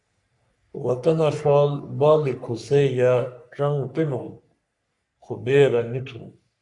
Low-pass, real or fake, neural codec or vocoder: 10.8 kHz; fake; codec, 44.1 kHz, 3.4 kbps, Pupu-Codec